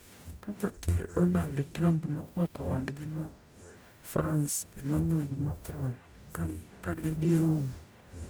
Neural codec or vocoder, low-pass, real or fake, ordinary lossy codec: codec, 44.1 kHz, 0.9 kbps, DAC; none; fake; none